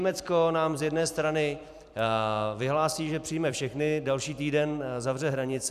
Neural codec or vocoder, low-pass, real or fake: vocoder, 44.1 kHz, 128 mel bands every 256 samples, BigVGAN v2; 14.4 kHz; fake